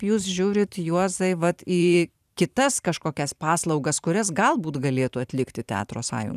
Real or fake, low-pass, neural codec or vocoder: fake; 14.4 kHz; vocoder, 44.1 kHz, 128 mel bands every 512 samples, BigVGAN v2